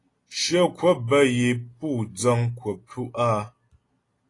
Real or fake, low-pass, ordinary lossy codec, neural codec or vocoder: real; 10.8 kHz; AAC, 32 kbps; none